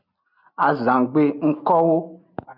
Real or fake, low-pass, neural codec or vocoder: real; 5.4 kHz; none